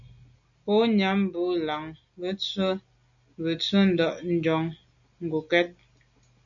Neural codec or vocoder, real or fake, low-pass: none; real; 7.2 kHz